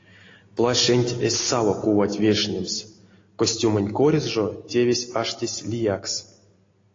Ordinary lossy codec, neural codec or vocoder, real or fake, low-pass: AAC, 32 kbps; none; real; 7.2 kHz